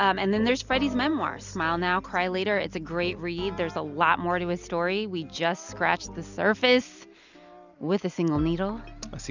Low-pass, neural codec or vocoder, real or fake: 7.2 kHz; none; real